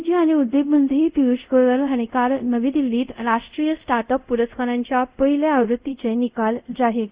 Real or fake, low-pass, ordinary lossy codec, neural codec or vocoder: fake; 3.6 kHz; Opus, 64 kbps; codec, 24 kHz, 0.5 kbps, DualCodec